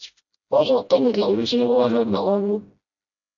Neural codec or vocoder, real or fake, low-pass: codec, 16 kHz, 0.5 kbps, FreqCodec, smaller model; fake; 7.2 kHz